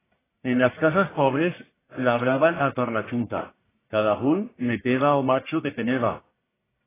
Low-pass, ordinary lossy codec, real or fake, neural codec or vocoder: 3.6 kHz; AAC, 16 kbps; fake; codec, 44.1 kHz, 1.7 kbps, Pupu-Codec